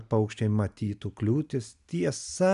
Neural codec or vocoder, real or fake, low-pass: none; real; 10.8 kHz